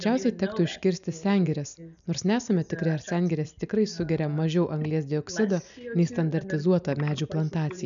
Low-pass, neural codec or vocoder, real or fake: 7.2 kHz; none; real